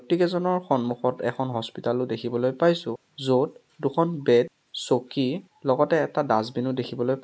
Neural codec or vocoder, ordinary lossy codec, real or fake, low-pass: none; none; real; none